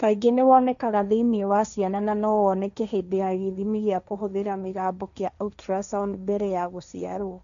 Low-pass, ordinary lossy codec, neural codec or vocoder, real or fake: 7.2 kHz; none; codec, 16 kHz, 1.1 kbps, Voila-Tokenizer; fake